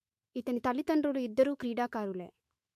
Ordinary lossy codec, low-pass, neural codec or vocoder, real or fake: MP3, 64 kbps; 14.4 kHz; autoencoder, 48 kHz, 128 numbers a frame, DAC-VAE, trained on Japanese speech; fake